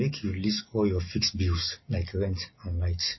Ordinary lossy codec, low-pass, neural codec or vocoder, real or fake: MP3, 24 kbps; 7.2 kHz; none; real